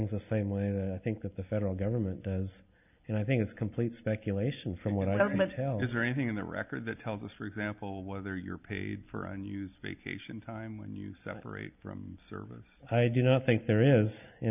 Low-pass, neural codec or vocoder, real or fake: 3.6 kHz; none; real